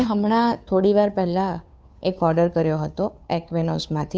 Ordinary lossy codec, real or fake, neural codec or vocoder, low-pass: none; fake; codec, 16 kHz, 2 kbps, FunCodec, trained on Chinese and English, 25 frames a second; none